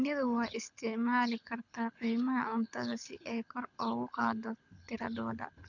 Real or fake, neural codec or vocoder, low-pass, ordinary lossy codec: fake; codec, 24 kHz, 6 kbps, HILCodec; 7.2 kHz; none